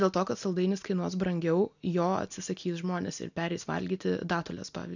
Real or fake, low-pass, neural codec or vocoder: real; 7.2 kHz; none